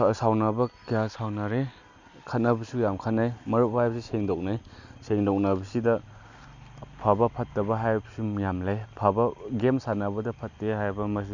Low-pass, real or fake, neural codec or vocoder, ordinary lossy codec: 7.2 kHz; real; none; none